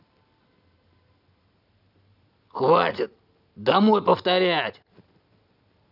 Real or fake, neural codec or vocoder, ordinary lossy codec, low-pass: real; none; none; 5.4 kHz